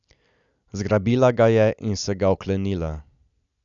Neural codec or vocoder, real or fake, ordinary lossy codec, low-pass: none; real; none; 7.2 kHz